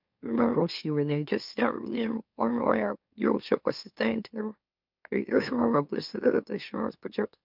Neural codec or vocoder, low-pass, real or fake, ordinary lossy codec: autoencoder, 44.1 kHz, a latent of 192 numbers a frame, MeloTTS; 5.4 kHz; fake; MP3, 48 kbps